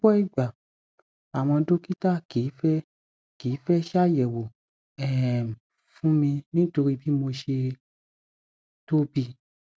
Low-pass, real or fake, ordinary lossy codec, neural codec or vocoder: none; real; none; none